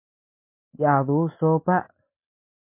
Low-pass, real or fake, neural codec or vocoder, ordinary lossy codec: 3.6 kHz; real; none; MP3, 24 kbps